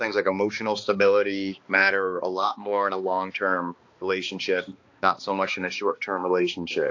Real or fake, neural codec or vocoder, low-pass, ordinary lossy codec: fake; codec, 16 kHz, 2 kbps, X-Codec, HuBERT features, trained on balanced general audio; 7.2 kHz; AAC, 48 kbps